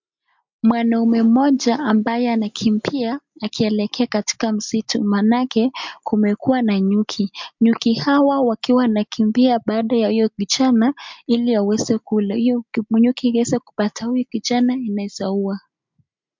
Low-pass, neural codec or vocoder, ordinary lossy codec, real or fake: 7.2 kHz; none; MP3, 64 kbps; real